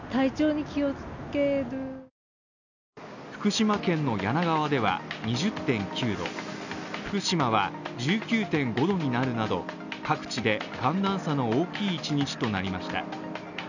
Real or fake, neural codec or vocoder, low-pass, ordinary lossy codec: real; none; 7.2 kHz; none